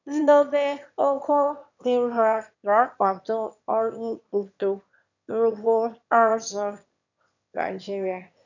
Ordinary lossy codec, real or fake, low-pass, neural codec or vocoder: none; fake; 7.2 kHz; autoencoder, 22.05 kHz, a latent of 192 numbers a frame, VITS, trained on one speaker